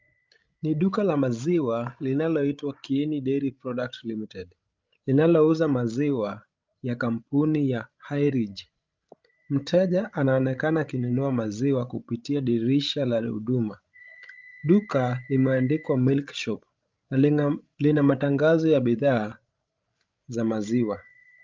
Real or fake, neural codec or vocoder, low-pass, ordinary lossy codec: fake; codec, 16 kHz, 16 kbps, FreqCodec, larger model; 7.2 kHz; Opus, 24 kbps